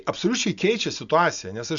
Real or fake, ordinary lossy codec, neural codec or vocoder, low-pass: real; Opus, 64 kbps; none; 7.2 kHz